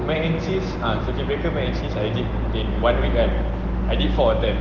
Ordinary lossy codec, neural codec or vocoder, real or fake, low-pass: Opus, 16 kbps; none; real; 7.2 kHz